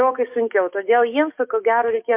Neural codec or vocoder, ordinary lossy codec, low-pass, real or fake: codec, 44.1 kHz, 7.8 kbps, DAC; MP3, 32 kbps; 3.6 kHz; fake